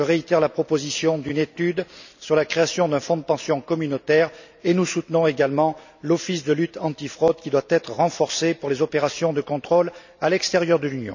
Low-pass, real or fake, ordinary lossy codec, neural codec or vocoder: 7.2 kHz; real; none; none